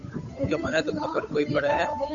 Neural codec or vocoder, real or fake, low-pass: codec, 16 kHz, 8 kbps, FunCodec, trained on Chinese and English, 25 frames a second; fake; 7.2 kHz